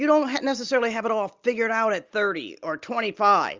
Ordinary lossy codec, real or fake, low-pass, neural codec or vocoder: Opus, 32 kbps; real; 7.2 kHz; none